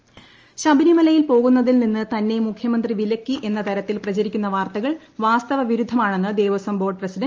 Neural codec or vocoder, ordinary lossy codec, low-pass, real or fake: none; Opus, 24 kbps; 7.2 kHz; real